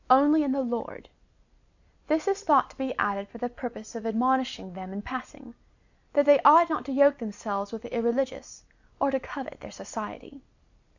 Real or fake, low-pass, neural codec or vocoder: real; 7.2 kHz; none